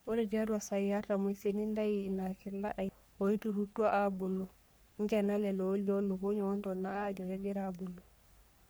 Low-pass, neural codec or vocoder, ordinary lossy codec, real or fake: none; codec, 44.1 kHz, 3.4 kbps, Pupu-Codec; none; fake